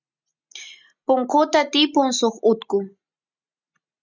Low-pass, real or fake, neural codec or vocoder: 7.2 kHz; real; none